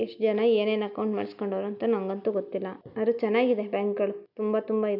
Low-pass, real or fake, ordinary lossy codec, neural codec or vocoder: 5.4 kHz; real; none; none